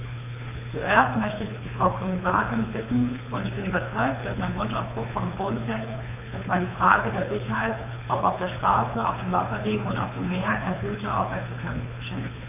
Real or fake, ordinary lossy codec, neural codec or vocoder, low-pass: fake; none; codec, 24 kHz, 3 kbps, HILCodec; 3.6 kHz